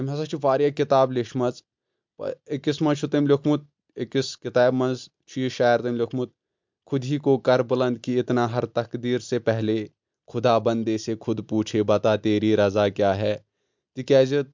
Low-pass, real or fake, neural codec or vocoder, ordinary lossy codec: 7.2 kHz; real; none; MP3, 64 kbps